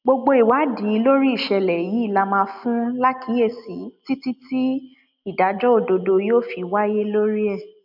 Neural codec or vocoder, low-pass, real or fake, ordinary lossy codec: none; 5.4 kHz; real; none